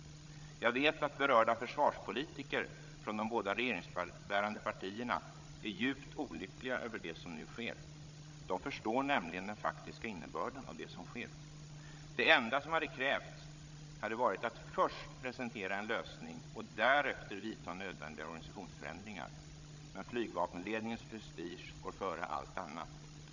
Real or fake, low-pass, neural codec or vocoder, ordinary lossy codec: fake; 7.2 kHz; codec, 16 kHz, 16 kbps, FreqCodec, larger model; none